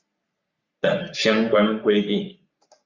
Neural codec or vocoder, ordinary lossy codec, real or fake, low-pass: codec, 44.1 kHz, 3.4 kbps, Pupu-Codec; Opus, 64 kbps; fake; 7.2 kHz